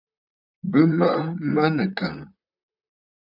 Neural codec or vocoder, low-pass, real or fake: vocoder, 44.1 kHz, 128 mel bands, Pupu-Vocoder; 5.4 kHz; fake